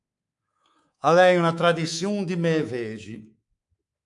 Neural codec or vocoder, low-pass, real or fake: codec, 24 kHz, 3.1 kbps, DualCodec; 10.8 kHz; fake